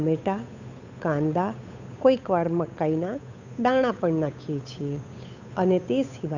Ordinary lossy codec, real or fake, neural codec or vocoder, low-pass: none; fake; codec, 16 kHz, 8 kbps, FunCodec, trained on Chinese and English, 25 frames a second; 7.2 kHz